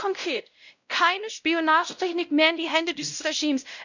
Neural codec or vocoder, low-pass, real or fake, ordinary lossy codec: codec, 16 kHz, 0.5 kbps, X-Codec, WavLM features, trained on Multilingual LibriSpeech; 7.2 kHz; fake; none